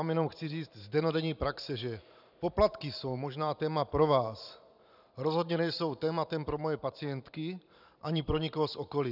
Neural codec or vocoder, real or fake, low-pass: vocoder, 44.1 kHz, 128 mel bands every 512 samples, BigVGAN v2; fake; 5.4 kHz